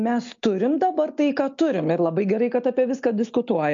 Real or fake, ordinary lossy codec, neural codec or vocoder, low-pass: real; MP3, 48 kbps; none; 7.2 kHz